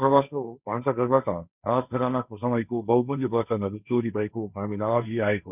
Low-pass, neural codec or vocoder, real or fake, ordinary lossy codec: 3.6 kHz; codec, 16 kHz, 1.1 kbps, Voila-Tokenizer; fake; none